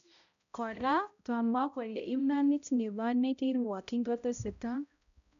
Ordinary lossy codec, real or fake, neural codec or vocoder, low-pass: none; fake; codec, 16 kHz, 0.5 kbps, X-Codec, HuBERT features, trained on balanced general audio; 7.2 kHz